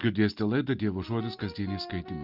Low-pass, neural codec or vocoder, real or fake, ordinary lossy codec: 5.4 kHz; none; real; Opus, 24 kbps